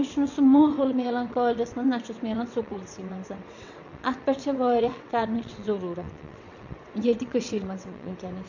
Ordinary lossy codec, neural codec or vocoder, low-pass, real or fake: none; vocoder, 22.05 kHz, 80 mel bands, WaveNeXt; 7.2 kHz; fake